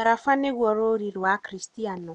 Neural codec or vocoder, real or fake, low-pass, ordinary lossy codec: none; real; 9.9 kHz; none